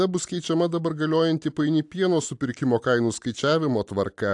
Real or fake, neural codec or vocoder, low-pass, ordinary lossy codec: real; none; 10.8 kHz; AAC, 64 kbps